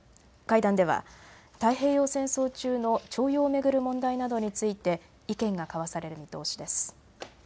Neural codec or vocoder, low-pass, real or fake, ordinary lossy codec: none; none; real; none